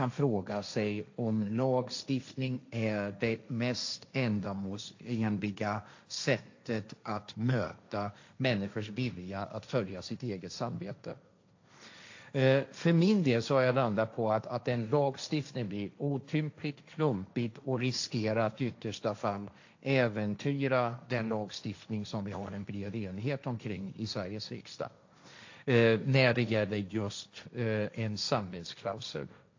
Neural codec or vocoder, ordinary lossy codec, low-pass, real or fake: codec, 16 kHz, 1.1 kbps, Voila-Tokenizer; AAC, 48 kbps; 7.2 kHz; fake